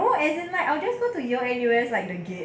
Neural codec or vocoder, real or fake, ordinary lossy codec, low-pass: none; real; none; none